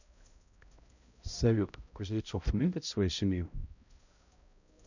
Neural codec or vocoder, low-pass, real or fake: codec, 16 kHz, 0.5 kbps, X-Codec, HuBERT features, trained on balanced general audio; 7.2 kHz; fake